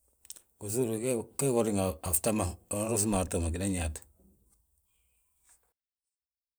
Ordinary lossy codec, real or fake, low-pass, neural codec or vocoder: none; fake; none; vocoder, 44.1 kHz, 128 mel bands every 512 samples, BigVGAN v2